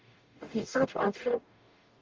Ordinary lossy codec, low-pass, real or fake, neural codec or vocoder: Opus, 32 kbps; 7.2 kHz; fake; codec, 44.1 kHz, 0.9 kbps, DAC